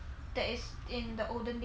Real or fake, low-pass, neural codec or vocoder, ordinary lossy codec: real; none; none; none